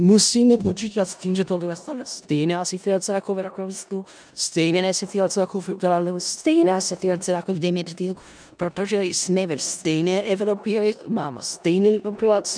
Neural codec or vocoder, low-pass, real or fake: codec, 16 kHz in and 24 kHz out, 0.4 kbps, LongCat-Audio-Codec, four codebook decoder; 9.9 kHz; fake